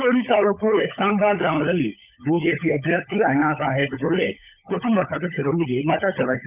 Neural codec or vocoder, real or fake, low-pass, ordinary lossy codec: codec, 16 kHz, 16 kbps, FunCodec, trained on LibriTTS, 50 frames a second; fake; 3.6 kHz; none